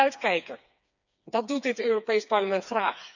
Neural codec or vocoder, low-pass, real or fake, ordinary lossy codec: codec, 16 kHz, 4 kbps, FreqCodec, smaller model; 7.2 kHz; fake; none